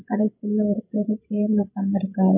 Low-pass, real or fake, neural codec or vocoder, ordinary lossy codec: 3.6 kHz; fake; codec, 16 kHz, 16 kbps, FreqCodec, larger model; AAC, 32 kbps